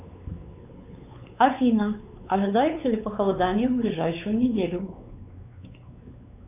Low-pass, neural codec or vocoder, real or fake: 3.6 kHz; codec, 16 kHz, 4 kbps, X-Codec, WavLM features, trained on Multilingual LibriSpeech; fake